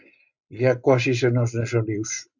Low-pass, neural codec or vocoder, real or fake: 7.2 kHz; none; real